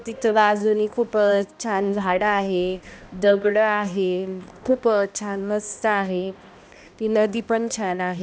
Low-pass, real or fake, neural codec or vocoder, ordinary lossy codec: none; fake; codec, 16 kHz, 1 kbps, X-Codec, HuBERT features, trained on balanced general audio; none